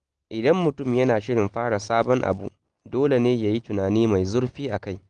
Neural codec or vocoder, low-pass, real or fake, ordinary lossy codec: none; 9.9 kHz; real; Opus, 24 kbps